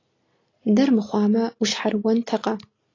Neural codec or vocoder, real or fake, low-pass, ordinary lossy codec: none; real; 7.2 kHz; AAC, 32 kbps